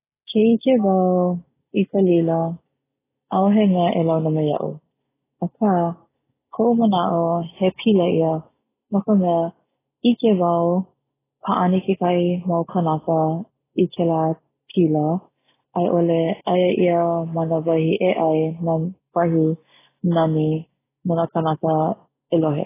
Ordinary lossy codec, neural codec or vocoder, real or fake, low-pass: AAC, 16 kbps; none; real; 3.6 kHz